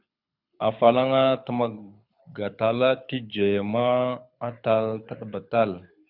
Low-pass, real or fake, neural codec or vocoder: 5.4 kHz; fake; codec, 24 kHz, 6 kbps, HILCodec